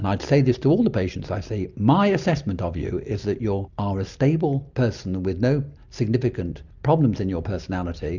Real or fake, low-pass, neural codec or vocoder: real; 7.2 kHz; none